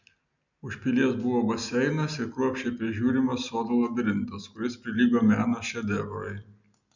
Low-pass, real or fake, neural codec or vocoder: 7.2 kHz; real; none